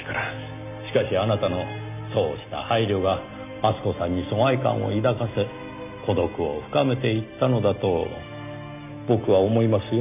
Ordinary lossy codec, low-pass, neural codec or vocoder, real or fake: none; 3.6 kHz; none; real